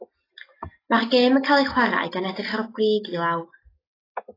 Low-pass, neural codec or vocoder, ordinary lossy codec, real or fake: 5.4 kHz; none; AAC, 24 kbps; real